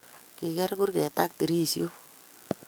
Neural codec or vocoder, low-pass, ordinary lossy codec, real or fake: codec, 44.1 kHz, 7.8 kbps, DAC; none; none; fake